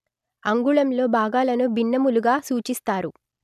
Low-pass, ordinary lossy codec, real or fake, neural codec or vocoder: 14.4 kHz; none; real; none